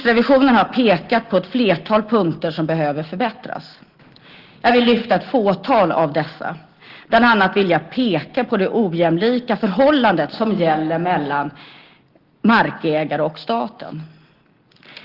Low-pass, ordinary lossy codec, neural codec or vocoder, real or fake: 5.4 kHz; Opus, 16 kbps; none; real